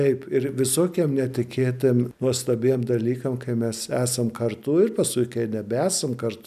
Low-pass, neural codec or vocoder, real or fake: 14.4 kHz; none; real